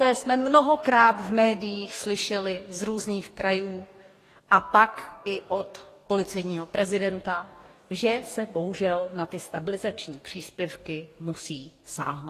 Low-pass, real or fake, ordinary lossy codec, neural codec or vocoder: 14.4 kHz; fake; AAC, 48 kbps; codec, 44.1 kHz, 2.6 kbps, DAC